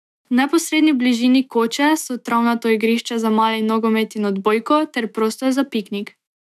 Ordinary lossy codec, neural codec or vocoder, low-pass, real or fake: none; autoencoder, 48 kHz, 128 numbers a frame, DAC-VAE, trained on Japanese speech; 14.4 kHz; fake